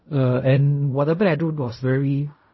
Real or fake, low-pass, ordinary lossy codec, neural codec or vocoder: fake; 7.2 kHz; MP3, 24 kbps; codec, 16 kHz in and 24 kHz out, 0.4 kbps, LongCat-Audio-Codec, fine tuned four codebook decoder